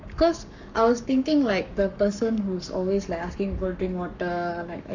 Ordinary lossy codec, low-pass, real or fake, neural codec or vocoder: none; 7.2 kHz; fake; codec, 44.1 kHz, 7.8 kbps, Pupu-Codec